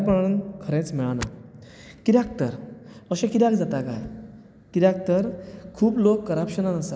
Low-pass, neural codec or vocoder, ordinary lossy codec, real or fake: none; none; none; real